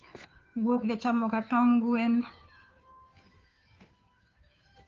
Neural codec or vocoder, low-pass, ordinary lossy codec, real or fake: codec, 16 kHz, 2 kbps, FunCodec, trained on Chinese and English, 25 frames a second; 7.2 kHz; Opus, 24 kbps; fake